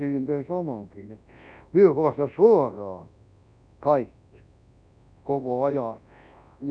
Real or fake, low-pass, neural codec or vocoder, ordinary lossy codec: fake; 9.9 kHz; codec, 24 kHz, 0.9 kbps, WavTokenizer, large speech release; none